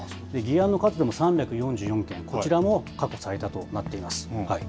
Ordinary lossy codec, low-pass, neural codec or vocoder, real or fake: none; none; none; real